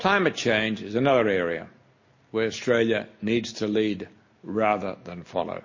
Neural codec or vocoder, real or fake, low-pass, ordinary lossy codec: none; real; 7.2 kHz; MP3, 32 kbps